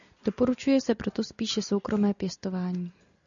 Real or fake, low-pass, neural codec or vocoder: real; 7.2 kHz; none